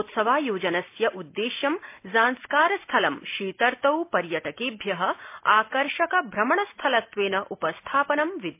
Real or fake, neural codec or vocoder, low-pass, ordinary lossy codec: real; none; 3.6 kHz; MP3, 24 kbps